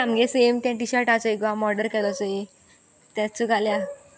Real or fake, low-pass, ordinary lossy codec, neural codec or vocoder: real; none; none; none